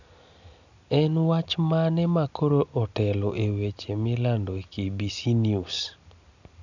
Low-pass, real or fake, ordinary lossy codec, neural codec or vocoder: 7.2 kHz; real; none; none